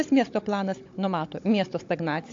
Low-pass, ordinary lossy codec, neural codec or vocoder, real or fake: 7.2 kHz; AAC, 48 kbps; codec, 16 kHz, 16 kbps, FunCodec, trained on Chinese and English, 50 frames a second; fake